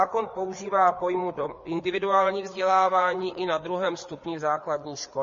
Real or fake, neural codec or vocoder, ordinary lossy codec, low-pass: fake; codec, 16 kHz, 4 kbps, FreqCodec, larger model; MP3, 32 kbps; 7.2 kHz